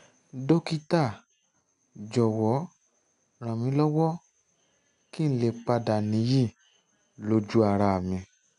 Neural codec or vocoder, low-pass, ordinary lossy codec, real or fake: none; 10.8 kHz; none; real